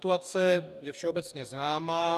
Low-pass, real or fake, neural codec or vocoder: 14.4 kHz; fake; codec, 44.1 kHz, 2.6 kbps, DAC